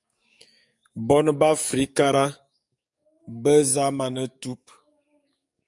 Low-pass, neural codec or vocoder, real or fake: 10.8 kHz; codec, 44.1 kHz, 7.8 kbps, DAC; fake